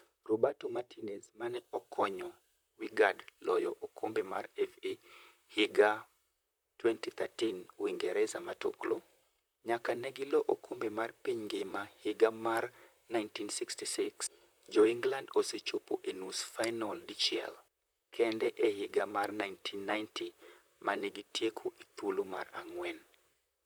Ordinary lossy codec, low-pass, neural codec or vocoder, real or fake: none; none; vocoder, 44.1 kHz, 128 mel bands, Pupu-Vocoder; fake